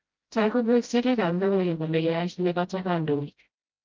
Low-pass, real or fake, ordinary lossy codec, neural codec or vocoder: 7.2 kHz; fake; Opus, 24 kbps; codec, 16 kHz, 0.5 kbps, FreqCodec, smaller model